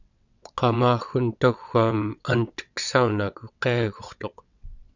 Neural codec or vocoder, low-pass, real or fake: vocoder, 22.05 kHz, 80 mel bands, WaveNeXt; 7.2 kHz; fake